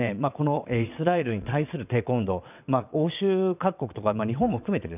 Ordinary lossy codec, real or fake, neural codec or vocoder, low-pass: none; fake; vocoder, 22.05 kHz, 80 mel bands, WaveNeXt; 3.6 kHz